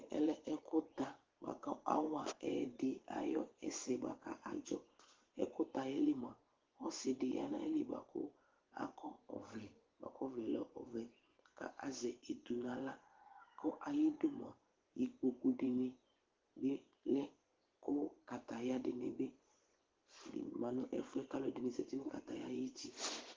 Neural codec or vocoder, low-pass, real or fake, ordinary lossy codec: vocoder, 24 kHz, 100 mel bands, Vocos; 7.2 kHz; fake; Opus, 16 kbps